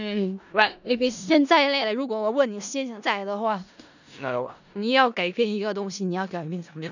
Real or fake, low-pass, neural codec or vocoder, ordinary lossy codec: fake; 7.2 kHz; codec, 16 kHz in and 24 kHz out, 0.4 kbps, LongCat-Audio-Codec, four codebook decoder; none